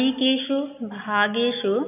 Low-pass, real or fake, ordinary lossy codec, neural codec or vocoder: 3.6 kHz; real; none; none